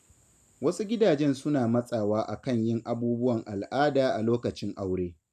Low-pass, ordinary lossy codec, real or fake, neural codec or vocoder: 14.4 kHz; none; real; none